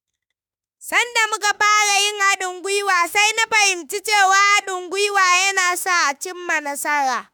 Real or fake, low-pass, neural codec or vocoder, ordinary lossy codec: fake; none; autoencoder, 48 kHz, 32 numbers a frame, DAC-VAE, trained on Japanese speech; none